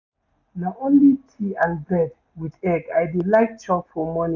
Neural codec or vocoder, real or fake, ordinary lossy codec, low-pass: none; real; none; 7.2 kHz